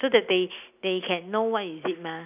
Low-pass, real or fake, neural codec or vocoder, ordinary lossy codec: 3.6 kHz; real; none; none